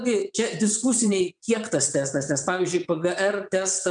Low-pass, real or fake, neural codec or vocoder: 9.9 kHz; fake; vocoder, 22.05 kHz, 80 mel bands, WaveNeXt